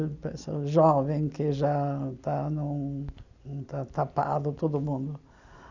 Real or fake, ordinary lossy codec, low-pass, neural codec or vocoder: real; none; 7.2 kHz; none